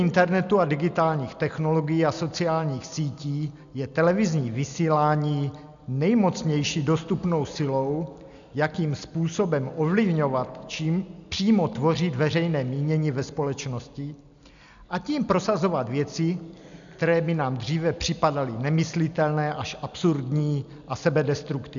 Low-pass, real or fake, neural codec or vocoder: 7.2 kHz; real; none